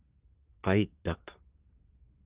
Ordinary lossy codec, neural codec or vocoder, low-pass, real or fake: Opus, 32 kbps; codec, 24 kHz, 1 kbps, SNAC; 3.6 kHz; fake